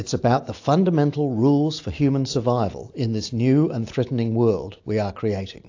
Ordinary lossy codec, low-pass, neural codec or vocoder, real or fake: AAC, 48 kbps; 7.2 kHz; none; real